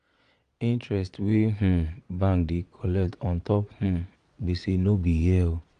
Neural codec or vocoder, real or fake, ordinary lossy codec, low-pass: vocoder, 22.05 kHz, 80 mel bands, Vocos; fake; Opus, 64 kbps; 9.9 kHz